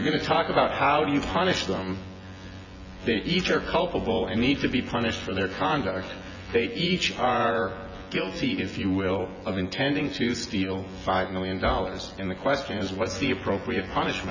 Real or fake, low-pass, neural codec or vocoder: fake; 7.2 kHz; vocoder, 24 kHz, 100 mel bands, Vocos